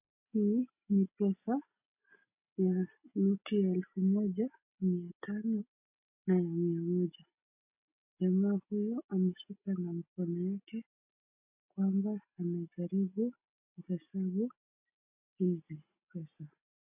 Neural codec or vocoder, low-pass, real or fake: none; 3.6 kHz; real